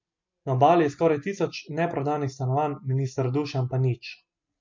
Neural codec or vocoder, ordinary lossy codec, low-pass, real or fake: none; MP3, 48 kbps; 7.2 kHz; real